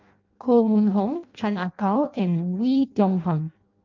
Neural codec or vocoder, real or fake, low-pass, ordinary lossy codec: codec, 16 kHz in and 24 kHz out, 0.6 kbps, FireRedTTS-2 codec; fake; 7.2 kHz; Opus, 24 kbps